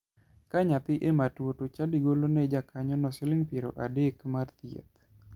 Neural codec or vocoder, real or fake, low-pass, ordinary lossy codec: none; real; 19.8 kHz; Opus, 32 kbps